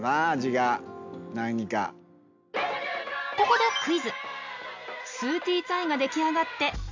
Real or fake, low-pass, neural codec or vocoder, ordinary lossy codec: real; 7.2 kHz; none; MP3, 64 kbps